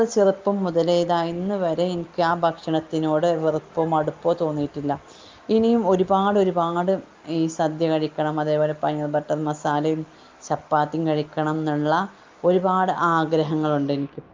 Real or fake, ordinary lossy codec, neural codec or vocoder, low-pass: real; Opus, 32 kbps; none; 7.2 kHz